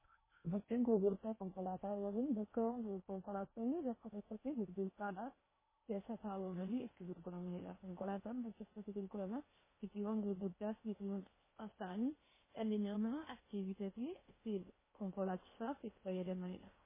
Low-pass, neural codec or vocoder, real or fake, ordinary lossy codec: 3.6 kHz; codec, 16 kHz in and 24 kHz out, 0.8 kbps, FocalCodec, streaming, 65536 codes; fake; MP3, 16 kbps